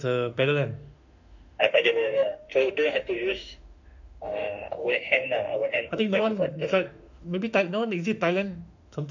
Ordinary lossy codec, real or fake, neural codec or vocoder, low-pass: none; fake; autoencoder, 48 kHz, 32 numbers a frame, DAC-VAE, trained on Japanese speech; 7.2 kHz